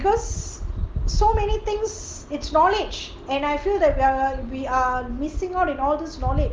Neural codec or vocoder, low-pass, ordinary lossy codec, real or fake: none; 9.9 kHz; Opus, 24 kbps; real